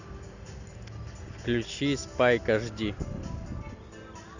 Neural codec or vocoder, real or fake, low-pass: none; real; 7.2 kHz